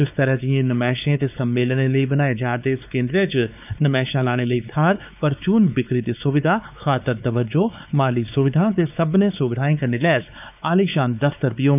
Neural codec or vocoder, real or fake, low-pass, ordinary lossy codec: codec, 16 kHz, 4 kbps, X-Codec, WavLM features, trained on Multilingual LibriSpeech; fake; 3.6 kHz; none